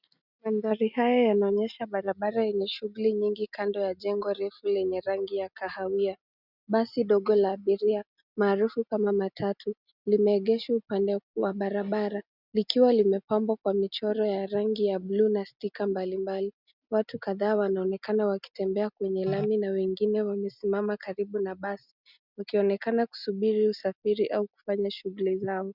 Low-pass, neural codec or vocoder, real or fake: 5.4 kHz; none; real